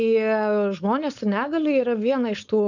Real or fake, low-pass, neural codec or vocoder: fake; 7.2 kHz; codec, 16 kHz, 4.8 kbps, FACodec